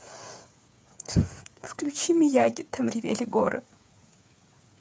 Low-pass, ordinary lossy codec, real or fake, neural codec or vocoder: none; none; fake; codec, 16 kHz, 8 kbps, FreqCodec, smaller model